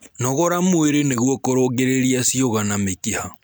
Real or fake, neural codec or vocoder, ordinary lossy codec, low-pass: real; none; none; none